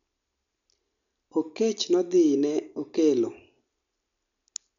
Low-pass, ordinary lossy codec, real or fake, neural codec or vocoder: 7.2 kHz; none; real; none